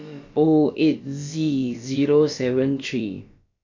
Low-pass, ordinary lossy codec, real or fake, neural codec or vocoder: 7.2 kHz; MP3, 64 kbps; fake; codec, 16 kHz, about 1 kbps, DyCAST, with the encoder's durations